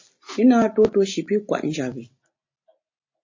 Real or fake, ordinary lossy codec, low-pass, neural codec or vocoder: real; MP3, 32 kbps; 7.2 kHz; none